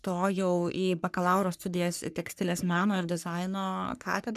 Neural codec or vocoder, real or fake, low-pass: codec, 44.1 kHz, 3.4 kbps, Pupu-Codec; fake; 14.4 kHz